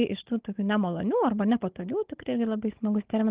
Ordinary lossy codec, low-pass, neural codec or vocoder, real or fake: Opus, 16 kbps; 3.6 kHz; codec, 16 kHz, 16 kbps, FunCodec, trained on Chinese and English, 50 frames a second; fake